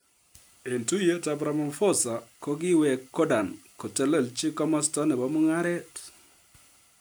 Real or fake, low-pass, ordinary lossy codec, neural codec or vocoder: real; none; none; none